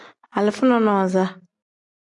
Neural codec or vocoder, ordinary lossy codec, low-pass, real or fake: none; MP3, 96 kbps; 10.8 kHz; real